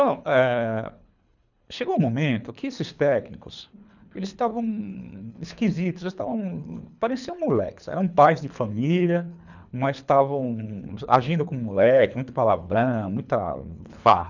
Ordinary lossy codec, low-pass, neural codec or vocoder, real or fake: none; 7.2 kHz; codec, 24 kHz, 3 kbps, HILCodec; fake